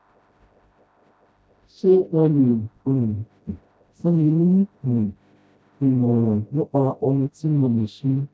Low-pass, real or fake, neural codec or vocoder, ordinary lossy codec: none; fake; codec, 16 kHz, 0.5 kbps, FreqCodec, smaller model; none